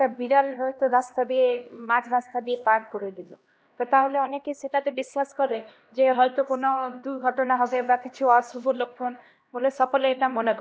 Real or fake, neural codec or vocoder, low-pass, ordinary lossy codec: fake; codec, 16 kHz, 1 kbps, X-Codec, HuBERT features, trained on LibriSpeech; none; none